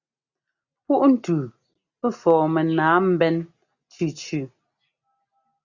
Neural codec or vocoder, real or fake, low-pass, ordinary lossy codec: none; real; 7.2 kHz; Opus, 64 kbps